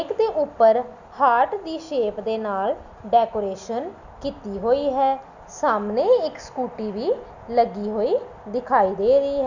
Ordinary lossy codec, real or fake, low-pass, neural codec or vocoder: none; real; 7.2 kHz; none